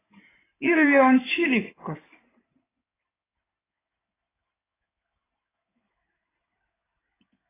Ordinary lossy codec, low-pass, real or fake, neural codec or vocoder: AAC, 16 kbps; 3.6 kHz; fake; codec, 16 kHz in and 24 kHz out, 2.2 kbps, FireRedTTS-2 codec